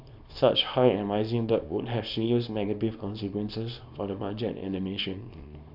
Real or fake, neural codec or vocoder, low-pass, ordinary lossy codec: fake; codec, 24 kHz, 0.9 kbps, WavTokenizer, small release; 5.4 kHz; none